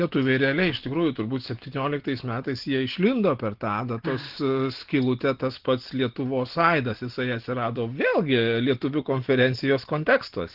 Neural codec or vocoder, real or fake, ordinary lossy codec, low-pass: none; real; Opus, 16 kbps; 5.4 kHz